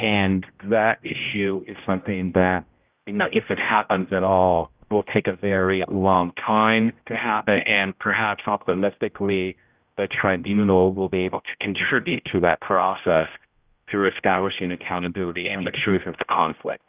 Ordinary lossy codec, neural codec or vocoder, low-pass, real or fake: Opus, 24 kbps; codec, 16 kHz, 0.5 kbps, X-Codec, HuBERT features, trained on general audio; 3.6 kHz; fake